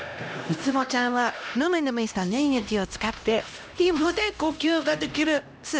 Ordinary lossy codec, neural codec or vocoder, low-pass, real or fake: none; codec, 16 kHz, 1 kbps, X-Codec, HuBERT features, trained on LibriSpeech; none; fake